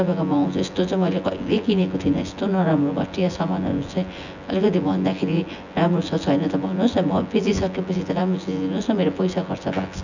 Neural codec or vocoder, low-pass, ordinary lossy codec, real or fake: vocoder, 24 kHz, 100 mel bands, Vocos; 7.2 kHz; none; fake